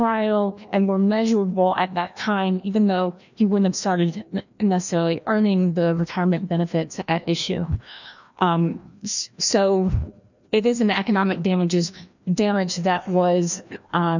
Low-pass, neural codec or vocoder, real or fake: 7.2 kHz; codec, 16 kHz, 1 kbps, FreqCodec, larger model; fake